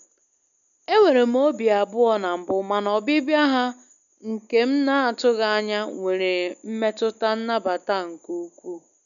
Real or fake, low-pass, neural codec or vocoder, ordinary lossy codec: real; 7.2 kHz; none; MP3, 96 kbps